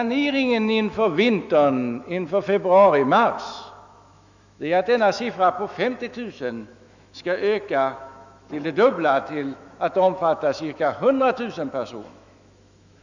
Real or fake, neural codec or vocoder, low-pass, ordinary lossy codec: fake; autoencoder, 48 kHz, 128 numbers a frame, DAC-VAE, trained on Japanese speech; 7.2 kHz; none